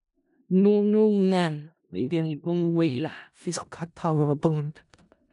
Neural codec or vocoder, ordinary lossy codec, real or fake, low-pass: codec, 16 kHz in and 24 kHz out, 0.4 kbps, LongCat-Audio-Codec, four codebook decoder; none; fake; 10.8 kHz